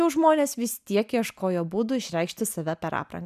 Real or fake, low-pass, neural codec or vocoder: real; 14.4 kHz; none